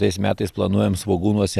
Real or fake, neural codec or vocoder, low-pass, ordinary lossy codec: real; none; 14.4 kHz; Opus, 64 kbps